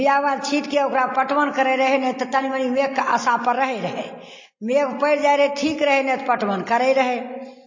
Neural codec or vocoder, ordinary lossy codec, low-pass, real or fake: none; MP3, 32 kbps; 7.2 kHz; real